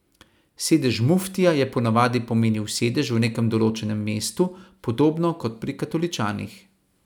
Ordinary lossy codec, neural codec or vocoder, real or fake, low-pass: none; none; real; 19.8 kHz